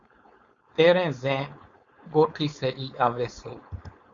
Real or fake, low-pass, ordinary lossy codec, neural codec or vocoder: fake; 7.2 kHz; Opus, 64 kbps; codec, 16 kHz, 4.8 kbps, FACodec